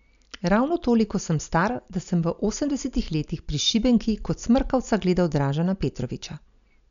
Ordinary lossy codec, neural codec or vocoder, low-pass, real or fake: none; none; 7.2 kHz; real